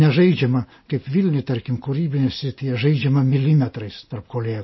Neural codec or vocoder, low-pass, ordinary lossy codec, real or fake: none; 7.2 kHz; MP3, 24 kbps; real